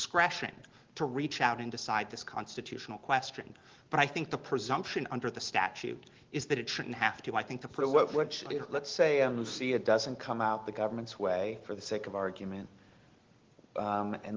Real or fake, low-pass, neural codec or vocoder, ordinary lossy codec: real; 7.2 kHz; none; Opus, 16 kbps